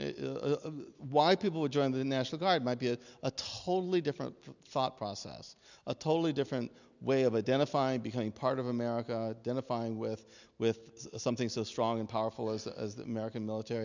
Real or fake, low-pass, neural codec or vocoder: real; 7.2 kHz; none